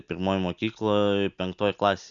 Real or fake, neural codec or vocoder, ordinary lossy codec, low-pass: real; none; MP3, 96 kbps; 7.2 kHz